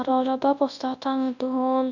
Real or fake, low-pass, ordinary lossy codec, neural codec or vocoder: fake; 7.2 kHz; none; codec, 24 kHz, 0.9 kbps, WavTokenizer, large speech release